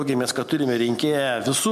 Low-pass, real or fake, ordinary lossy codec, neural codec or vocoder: 14.4 kHz; real; MP3, 96 kbps; none